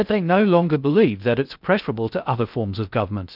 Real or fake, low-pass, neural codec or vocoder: fake; 5.4 kHz; codec, 16 kHz in and 24 kHz out, 0.6 kbps, FocalCodec, streaming, 2048 codes